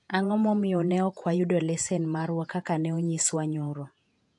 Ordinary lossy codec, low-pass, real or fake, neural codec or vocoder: AAC, 64 kbps; 10.8 kHz; fake; vocoder, 48 kHz, 128 mel bands, Vocos